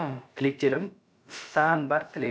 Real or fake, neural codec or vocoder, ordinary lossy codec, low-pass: fake; codec, 16 kHz, about 1 kbps, DyCAST, with the encoder's durations; none; none